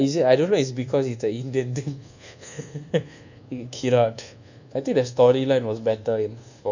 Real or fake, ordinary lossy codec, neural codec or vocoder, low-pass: fake; none; codec, 24 kHz, 1.2 kbps, DualCodec; 7.2 kHz